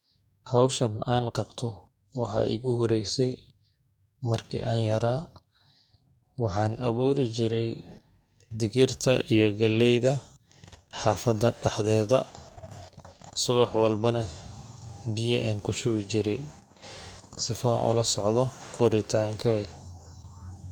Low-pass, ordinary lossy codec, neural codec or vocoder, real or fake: 19.8 kHz; none; codec, 44.1 kHz, 2.6 kbps, DAC; fake